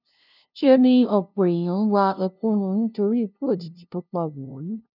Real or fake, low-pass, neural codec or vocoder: fake; 5.4 kHz; codec, 16 kHz, 0.5 kbps, FunCodec, trained on LibriTTS, 25 frames a second